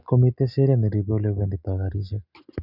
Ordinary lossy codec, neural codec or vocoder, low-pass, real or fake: none; none; 5.4 kHz; real